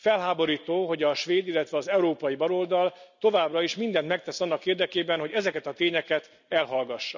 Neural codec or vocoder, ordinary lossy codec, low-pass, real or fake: none; none; 7.2 kHz; real